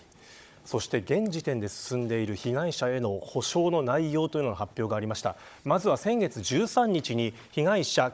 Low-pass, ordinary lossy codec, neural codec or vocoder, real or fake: none; none; codec, 16 kHz, 16 kbps, FunCodec, trained on Chinese and English, 50 frames a second; fake